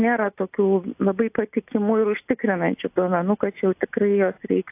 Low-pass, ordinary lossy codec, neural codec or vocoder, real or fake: 3.6 kHz; AAC, 32 kbps; none; real